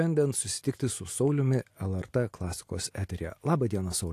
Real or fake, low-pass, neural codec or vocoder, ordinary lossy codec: real; 14.4 kHz; none; AAC, 64 kbps